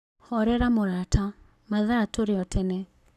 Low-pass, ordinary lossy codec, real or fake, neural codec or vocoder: 14.4 kHz; none; fake; codec, 44.1 kHz, 7.8 kbps, Pupu-Codec